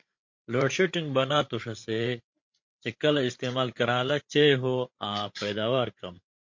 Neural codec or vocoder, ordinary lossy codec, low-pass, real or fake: codec, 16 kHz, 8 kbps, FreqCodec, larger model; MP3, 48 kbps; 7.2 kHz; fake